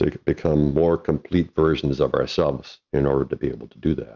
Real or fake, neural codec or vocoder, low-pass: real; none; 7.2 kHz